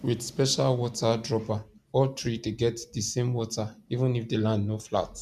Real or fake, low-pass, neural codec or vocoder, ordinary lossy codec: real; 14.4 kHz; none; MP3, 96 kbps